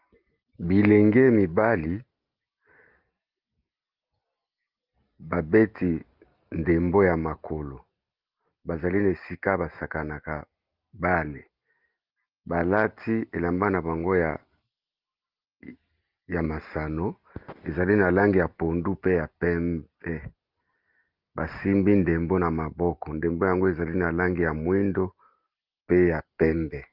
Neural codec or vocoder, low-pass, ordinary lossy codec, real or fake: none; 5.4 kHz; Opus, 32 kbps; real